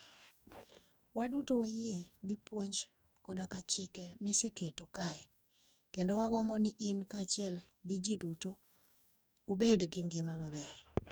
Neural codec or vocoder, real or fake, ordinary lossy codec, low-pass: codec, 44.1 kHz, 2.6 kbps, DAC; fake; none; none